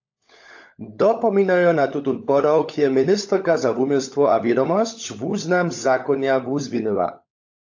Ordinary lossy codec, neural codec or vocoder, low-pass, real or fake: AAC, 48 kbps; codec, 16 kHz, 16 kbps, FunCodec, trained on LibriTTS, 50 frames a second; 7.2 kHz; fake